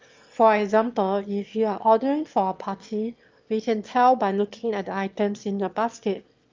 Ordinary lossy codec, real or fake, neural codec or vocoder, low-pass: Opus, 32 kbps; fake; autoencoder, 22.05 kHz, a latent of 192 numbers a frame, VITS, trained on one speaker; 7.2 kHz